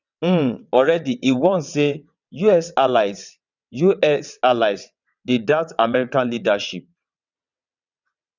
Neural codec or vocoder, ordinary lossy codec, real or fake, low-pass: vocoder, 22.05 kHz, 80 mel bands, WaveNeXt; none; fake; 7.2 kHz